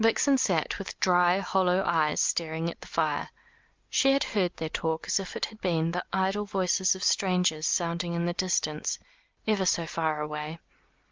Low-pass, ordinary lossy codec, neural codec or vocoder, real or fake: 7.2 kHz; Opus, 32 kbps; none; real